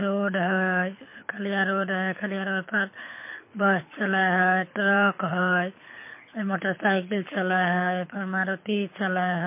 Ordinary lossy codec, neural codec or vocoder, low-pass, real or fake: MP3, 24 kbps; codec, 24 kHz, 6 kbps, HILCodec; 3.6 kHz; fake